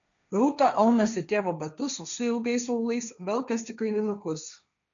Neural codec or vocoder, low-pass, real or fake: codec, 16 kHz, 1.1 kbps, Voila-Tokenizer; 7.2 kHz; fake